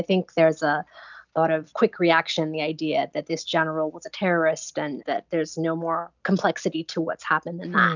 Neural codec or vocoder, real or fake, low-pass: none; real; 7.2 kHz